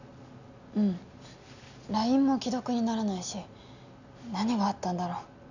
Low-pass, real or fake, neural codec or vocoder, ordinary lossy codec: 7.2 kHz; real; none; none